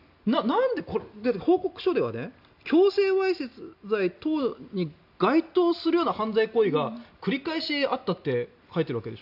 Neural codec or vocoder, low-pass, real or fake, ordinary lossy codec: none; 5.4 kHz; real; none